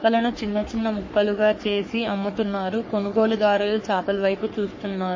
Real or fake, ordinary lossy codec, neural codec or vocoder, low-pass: fake; MP3, 32 kbps; codec, 44.1 kHz, 3.4 kbps, Pupu-Codec; 7.2 kHz